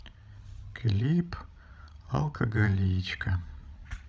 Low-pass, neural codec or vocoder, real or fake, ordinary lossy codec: none; codec, 16 kHz, 16 kbps, FreqCodec, larger model; fake; none